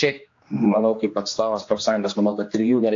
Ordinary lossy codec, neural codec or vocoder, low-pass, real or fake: AAC, 48 kbps; codec, 16 kHz, 2 kbps, X-Codec, HuBERT features, trained on general audio; 7.2 kHz; fake